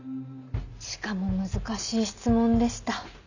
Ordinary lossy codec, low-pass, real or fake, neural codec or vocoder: none; 7.2 kHz; real; none